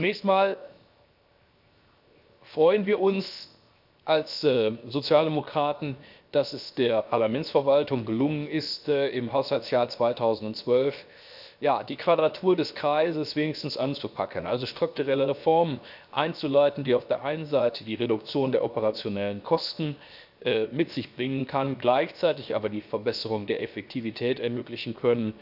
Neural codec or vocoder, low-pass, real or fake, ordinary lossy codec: codec, 16 kHz, 0.7 kbps, FocalCodec; 5.4 kHz; fake; none